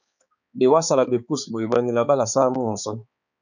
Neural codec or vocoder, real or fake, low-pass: codec, 16 kHz, 4 kbps, X-Codec, HuBERT features, trained on balanced general audio; fake; 7.2 kHz